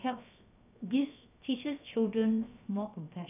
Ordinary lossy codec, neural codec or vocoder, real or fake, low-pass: none; codec, 16 kHz, 0.7 kbps, FocalCodec; fake; 3.6 kHz